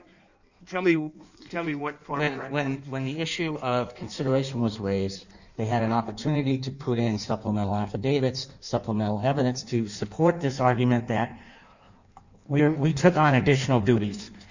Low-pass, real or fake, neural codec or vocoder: 7.2 kHz; fake; codec, 16 kHz in and 24 kHz out, 1.1 kbps, FireRedTTS-2 codec